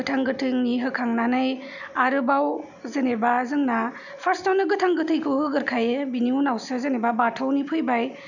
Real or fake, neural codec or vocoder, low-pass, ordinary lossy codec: real; none; 7.2 kHz; none